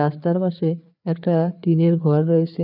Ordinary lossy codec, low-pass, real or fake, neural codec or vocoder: none; 5.4 kHz; fake; codec, 16 kHz, 4 kbps, FreqCodec, larger model